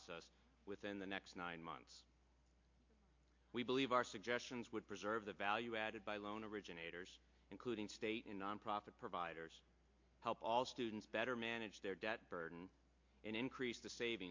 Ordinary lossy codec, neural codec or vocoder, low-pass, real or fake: MP3, 48 kbps; none; 7.2 kHz; real